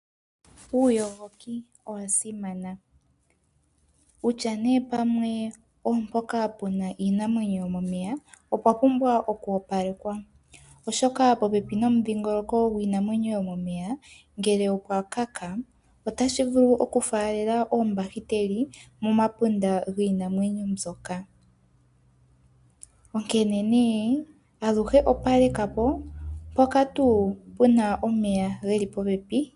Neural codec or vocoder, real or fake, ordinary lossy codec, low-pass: none; real; MP3, 96 kbps; 10.8 kHz